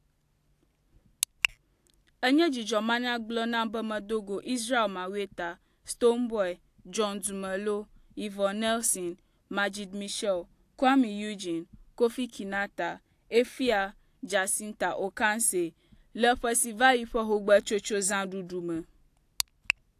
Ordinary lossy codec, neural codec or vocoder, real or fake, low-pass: AAC, 64 kbps; none; real; 14.4 kHz